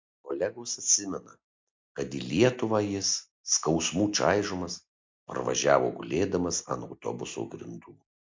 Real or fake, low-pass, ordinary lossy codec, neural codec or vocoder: real; 7.2 kHz; MP3, 64 kbps; none